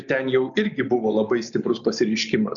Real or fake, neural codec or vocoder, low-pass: real; none; 7.2 kHz